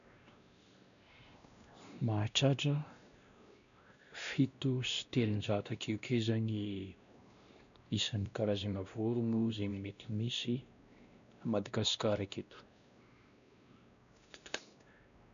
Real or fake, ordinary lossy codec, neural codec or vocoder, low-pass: fake; none; codec, 16 kHz, 1 kbps, X-Codec, WavLM features, trained on Multilingual LibriSpeech; 7.2 kHz